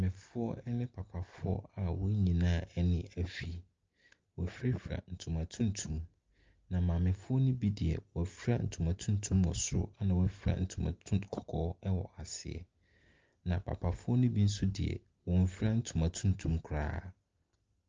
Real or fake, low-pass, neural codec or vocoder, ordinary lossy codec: real; 7.2 kHz; none; Opus, 24 kbps